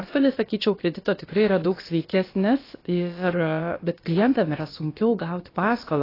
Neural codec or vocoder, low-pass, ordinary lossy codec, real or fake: codec, 16 kHz, about 1 kbps, DyCAST, with the encoder's durations; 5.4 kHz; AAC, 24 kbps; fake